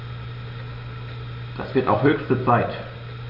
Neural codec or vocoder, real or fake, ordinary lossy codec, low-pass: none; real; none; 5.4 kHz